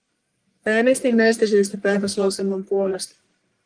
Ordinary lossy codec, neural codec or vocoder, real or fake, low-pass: Opus, 32 kbps; codec, 44.1 kHz, 1.7 kbps, Pupu-Codec; fake; 9.9 kHz